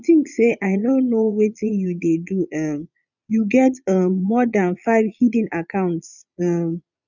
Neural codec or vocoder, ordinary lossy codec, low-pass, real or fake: vocoder, 22.05 kHz, 80 mel bands, Vocos; none; 7.2 kHz; fake